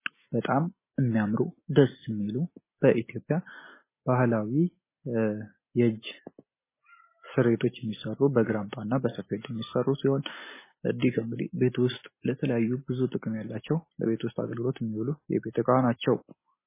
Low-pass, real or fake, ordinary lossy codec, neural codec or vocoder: 3.6 kHz; real; MP3, 16 kbps; none